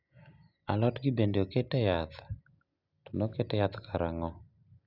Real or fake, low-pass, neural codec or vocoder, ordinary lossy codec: real; 5.4 kHz; none; none